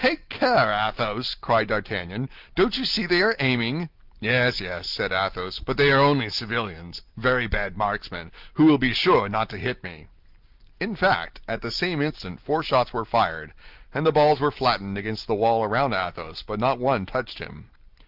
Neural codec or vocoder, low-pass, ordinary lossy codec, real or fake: none; 5.4 kHz; Opus, 32 kbps; real